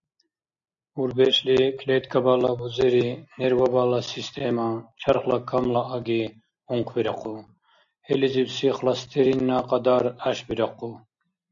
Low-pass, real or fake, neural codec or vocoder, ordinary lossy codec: 7.2 kHz; real; none; AAC, 64 kbps